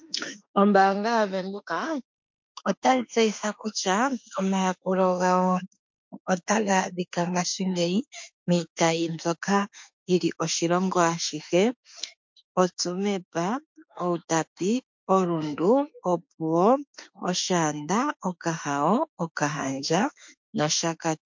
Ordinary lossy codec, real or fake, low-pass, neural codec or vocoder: MP3, 48 kbps; fake; 7.2 kHz; autoencoder, 48 kHz, 32 numbers a frame, DAC-VAE, trained on Japanese speech